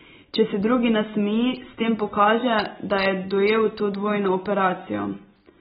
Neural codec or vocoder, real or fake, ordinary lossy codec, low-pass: none; real; AAC, 16 kbps; 19.8 kHz